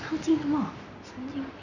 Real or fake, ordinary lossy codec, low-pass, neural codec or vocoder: real; none; 7.2 kHz; none